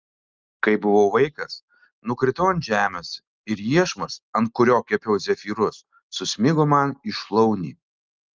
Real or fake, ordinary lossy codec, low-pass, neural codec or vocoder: real; Opus, 24 kbps; 7.2 kHz; none